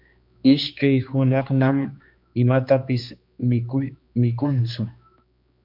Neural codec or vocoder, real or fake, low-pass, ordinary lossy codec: codec, 16 kHz, 2 kbps, X-Codec, HuBERT features, trained on general audio; fake; 5.4 kHz; MP3, 48 kbps